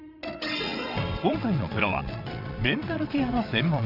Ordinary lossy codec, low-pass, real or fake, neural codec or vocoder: none; 5.4 kHz; fake; vocoder, 22.05 kHz, 80 mel bands, WaveNeXt